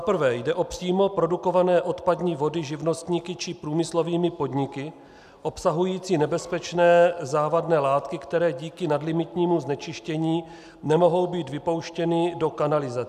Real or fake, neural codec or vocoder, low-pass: real; none; 14.4 kHz